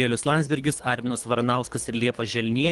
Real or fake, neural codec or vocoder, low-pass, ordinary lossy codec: fake; codec, 24 kHz, 3 kbps, HILCodec; 10.8 kHz; Opus, 24 kbps